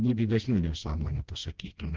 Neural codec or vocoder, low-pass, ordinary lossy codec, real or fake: codec, 16 kHz, 1 kbps, FreqCodec, smaller model; 7.2 kHz; Opus, 16 kbps; fake